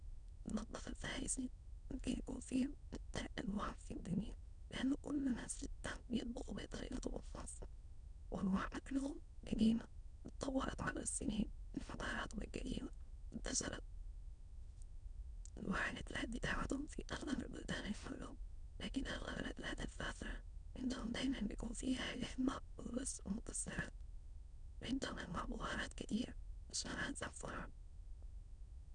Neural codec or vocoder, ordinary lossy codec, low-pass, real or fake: autoencoder, 22.05 kHz, a latent of 192 numbers a frame, VITS, trained on many speakers; none; 9.9 kHz; fake